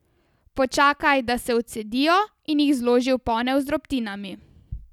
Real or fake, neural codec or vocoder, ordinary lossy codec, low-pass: real; none; none; 19.8 kHz